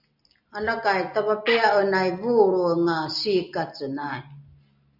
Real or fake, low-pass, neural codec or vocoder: real; 5.4 kHz; none